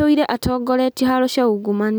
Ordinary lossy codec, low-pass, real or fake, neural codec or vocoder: none; none; real; none